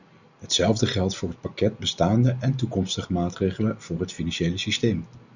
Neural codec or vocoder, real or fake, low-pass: none; real; 7.2 kHz